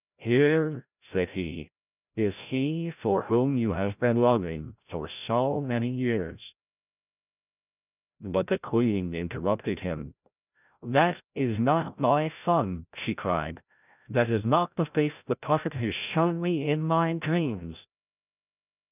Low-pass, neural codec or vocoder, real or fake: 3.6 kHz; codec, 16 kHz, 0.5 kbps, FreqCodec, larger model; fake